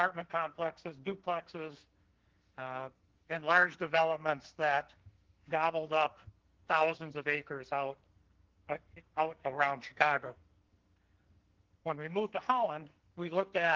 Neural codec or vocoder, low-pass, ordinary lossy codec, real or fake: codec, 44.1 kHz, 2.6 kbps, SNAC; 7.2 kHz; Opus, 16 kbps; fake